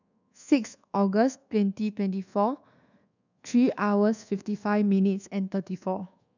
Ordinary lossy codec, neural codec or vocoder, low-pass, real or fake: none; codec, 24 kHz, 1.2 kbps, DualCodec; 7.2 kHz; fake